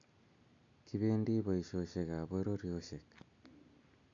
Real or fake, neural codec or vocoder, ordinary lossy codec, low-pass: real; none; AAC, 64 kbps; 7.2 kHz